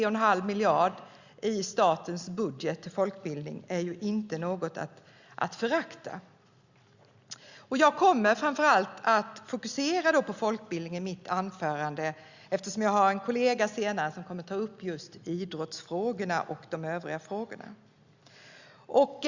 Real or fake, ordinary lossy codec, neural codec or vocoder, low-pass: real; Opus, 64 kbps; none; 7.2 kHz